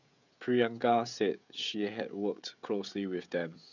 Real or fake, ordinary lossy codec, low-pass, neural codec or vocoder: fake; none; 7.2 kHz; codec, 16 kHz, 16 kbps, FreqCodec, smaller model